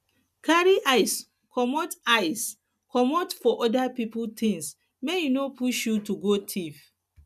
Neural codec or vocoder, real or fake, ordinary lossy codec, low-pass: none; real; none; 14.4 kHz